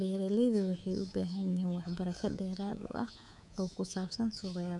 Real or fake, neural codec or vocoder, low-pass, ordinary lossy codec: fake; codec, 24 kHz, 3.1 kbps, DualCodec; 10.8 kHz; none